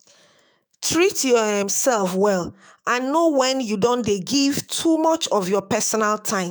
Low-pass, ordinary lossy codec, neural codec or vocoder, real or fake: none; none; autoencoder, 48 kHz, 128 numbers a frame, DAC-VAE, trained on Japanese speech; fake